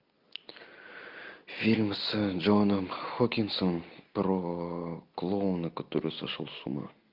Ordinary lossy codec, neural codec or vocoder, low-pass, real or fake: MP3, 48 kbps; none; 5.4 kHz; real